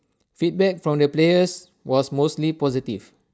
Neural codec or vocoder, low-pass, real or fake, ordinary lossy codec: none; none; real; none